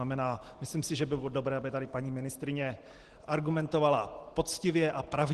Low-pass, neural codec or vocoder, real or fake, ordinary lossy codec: 10.8 kHz; none; real; Opus, 16 kbps